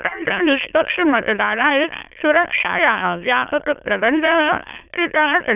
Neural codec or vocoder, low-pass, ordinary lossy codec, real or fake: autoencoder, 22.05 kHz, a latent of 192 numbers a frame, VITS, trained on many speakers; 3.6 kHz; none; fake